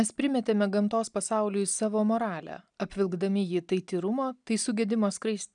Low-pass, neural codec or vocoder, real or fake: 9.9 kHz; none; real